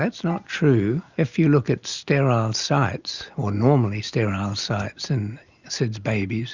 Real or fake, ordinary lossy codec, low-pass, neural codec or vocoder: real; Opus, 64 kbps; 7.2 kHz; none